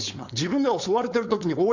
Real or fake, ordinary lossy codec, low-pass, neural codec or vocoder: fake; none; 7.2 kHz; codec, 16 kHz, 4.8 kbps, FACodec